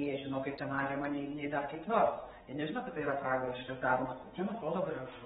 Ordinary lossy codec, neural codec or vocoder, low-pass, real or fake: AAC, 16 kbps; codec, 16 kHz, 4 kbps, X-Codec, HuBERT features, trained on general audio; 7.2 kHz; fake